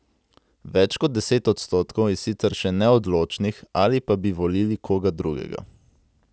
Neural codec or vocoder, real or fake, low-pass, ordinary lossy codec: none; real; none; none